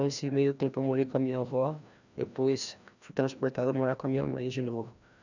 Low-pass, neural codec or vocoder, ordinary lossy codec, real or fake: 7.2 kHz; codec, 16 kHz, 1 kbps, FreqCodec, larger model; none; fake